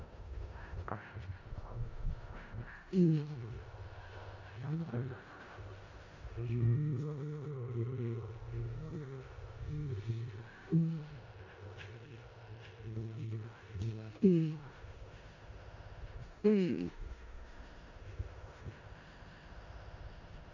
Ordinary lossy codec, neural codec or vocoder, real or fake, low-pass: none; codec, 16 kHz in and 24 kHz out, 0.4 kbps, LongCat-Audio-Codec, four codebook decoder; fake; 7.2 kHz